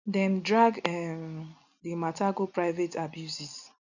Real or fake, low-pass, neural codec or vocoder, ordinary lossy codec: real; 7.2 kHz; none; none